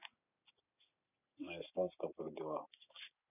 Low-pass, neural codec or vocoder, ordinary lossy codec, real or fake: 3.6 kHz; none; none; real